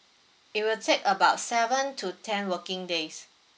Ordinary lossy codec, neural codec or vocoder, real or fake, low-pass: none; none; real; none